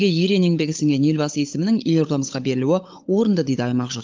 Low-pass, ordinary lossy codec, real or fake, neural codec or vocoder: 7.2 kHz; Opus, 24 kbps; fake; codec, 16 kHz, 16 kbps, FunCodec, trained on LibriTTS, 50 frames a second